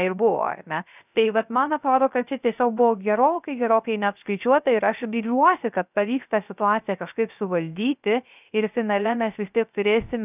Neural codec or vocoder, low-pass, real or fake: codec, 16 kHz, 0.3 kbps, FocalCodec; 3.6 kHz; fake